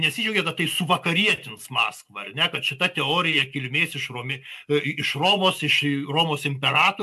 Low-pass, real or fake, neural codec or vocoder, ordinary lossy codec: 14.4 kHz; real; none; AAC, 96 kbps